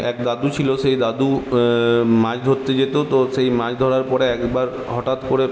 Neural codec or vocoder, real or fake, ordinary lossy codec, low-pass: none; real; none; none